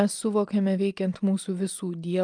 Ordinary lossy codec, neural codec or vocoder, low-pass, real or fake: Opus, 32 kbps; none; 9.9 kHz; real